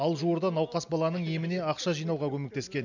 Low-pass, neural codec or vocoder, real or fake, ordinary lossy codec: 7.2 kHz; none; real; none